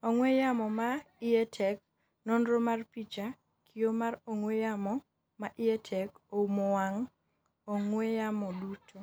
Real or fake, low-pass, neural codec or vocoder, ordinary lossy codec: real; none; none; none